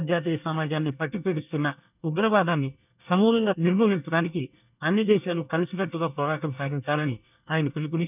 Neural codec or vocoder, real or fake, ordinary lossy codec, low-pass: codec, 24 kHz, 1 kbps, SNAC; fake; none; 3.6 kHz